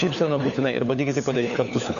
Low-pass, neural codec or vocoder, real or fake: 7.2 kHz; codec, 16 kHz, 4 kbps, FunCodec, trained on LibriTTS, 50 frames a second; fake